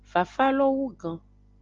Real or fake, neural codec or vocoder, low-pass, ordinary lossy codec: real; none; 7.2 kHz; Opus, 32 kbps